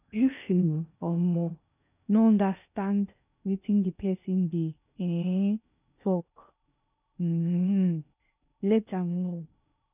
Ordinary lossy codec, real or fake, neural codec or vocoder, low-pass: none; fake; codec, 16 kHz in and 24 kHz out, 0.6 kbps, FocalCodec, streaming, 2048 codes; 3.6 kHz